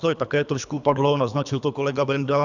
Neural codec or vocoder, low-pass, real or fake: codec, 24 kHz, 3 kbps, HILCodec; 7.2 kHz; fake